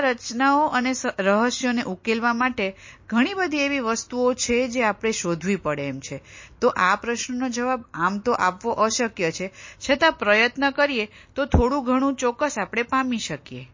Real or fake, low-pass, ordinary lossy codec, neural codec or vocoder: real; 7.2 kHz; MP3, 32 kbps; none